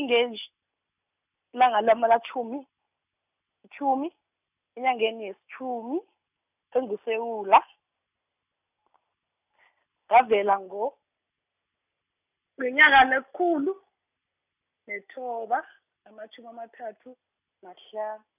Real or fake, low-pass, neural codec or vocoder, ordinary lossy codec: real; 3.6 kHz; none; none